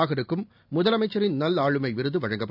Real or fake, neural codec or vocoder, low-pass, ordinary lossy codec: real; none; 5.4 kHz; none